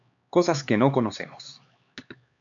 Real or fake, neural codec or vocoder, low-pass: fake; codec, 16 kHz, 4 kbps, X-Codec, HuBERT features, trained on LibriSpeech; 7.2 kHz